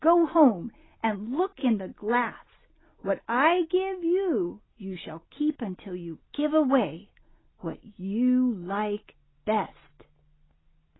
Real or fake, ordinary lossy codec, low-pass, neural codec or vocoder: real; AAC, 16 kbps; 7.2 kHz; none